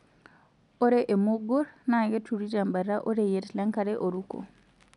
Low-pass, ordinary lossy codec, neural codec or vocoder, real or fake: 10.8 kHz; none; none; real